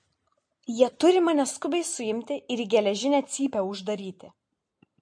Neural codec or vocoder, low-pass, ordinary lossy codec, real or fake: none; 9.9 kHz; MP3, 48 kbps; real